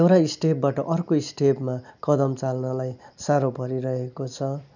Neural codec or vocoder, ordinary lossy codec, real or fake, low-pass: none; none; real; 7.2 kHz